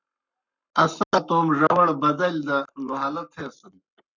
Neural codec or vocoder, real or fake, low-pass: codec, 44.1 kHz, 7.8 kbps, Pupu-Codec; fake; 7.2 kHz